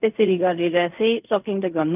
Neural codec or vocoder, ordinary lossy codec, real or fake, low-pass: codec, 16 kHz in and 24 kHz out, 0.4 kbps, LongCat-Audio-Codec, fine tuned four codebook decoder; none; fake; 3.6 kHz